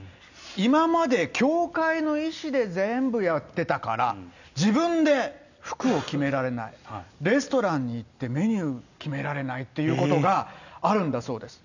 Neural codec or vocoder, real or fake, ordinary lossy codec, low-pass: none; real; none; 7.2 kHz